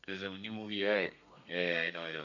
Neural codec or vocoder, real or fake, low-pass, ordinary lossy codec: codec, 32 kHz, 1.9 kbps, SNAC; fake; 7.2 kHz; none